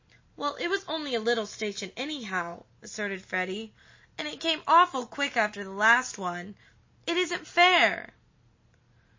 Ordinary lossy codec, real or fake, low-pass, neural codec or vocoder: MP3, 32 kbps; real; 7.2 kHz; none